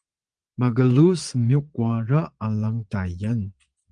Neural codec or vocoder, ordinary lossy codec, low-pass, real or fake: vocoder, 22.05 kHz, 80 mel bands, Vocos; Opus, 24 kbps; 9.9 kHz; fake